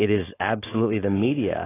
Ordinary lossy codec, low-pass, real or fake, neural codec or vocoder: AAC, 16 kbps; 3.6 kHz; fake; codec, 16 kHz, 4.8 kbps, FACodec